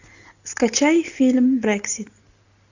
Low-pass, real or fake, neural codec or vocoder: 7.2 kHz; real; none